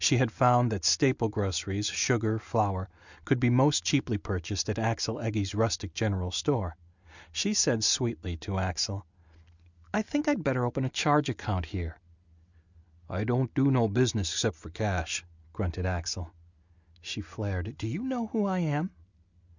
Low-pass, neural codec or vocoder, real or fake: 7.2 kHz; none; real